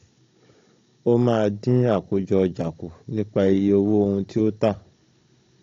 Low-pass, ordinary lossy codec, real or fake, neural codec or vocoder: 7.2 kHz; AAC, 48 kbps; fake; codec, 16 kHz, 16 kbps, FunCodec, trained on Chinese and English, 50 frames a second